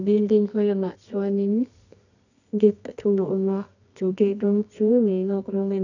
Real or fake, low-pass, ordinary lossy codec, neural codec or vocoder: fake; 7.2 kHz; none; codec, 24 kHz, 0.9 kbps, WavTokenizer, medium music audio release